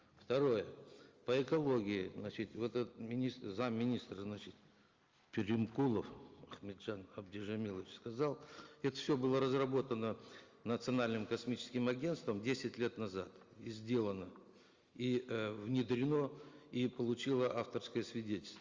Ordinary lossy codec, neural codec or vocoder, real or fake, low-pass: Opus, 32 kbps; none; real; 7.2 kHz